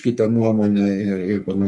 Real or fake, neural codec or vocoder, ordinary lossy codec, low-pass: fake; codec, 44.1 kHz, 3.4 kbps, Pupu-Codec; Opus, 64 kbps; 10.8 kHz